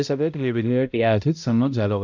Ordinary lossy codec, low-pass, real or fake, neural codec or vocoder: none; 7.2 kHz; fake; codec, 16 kHz, 0.5 kbps, X-Codec, HuBERT features, trained on balanced general audio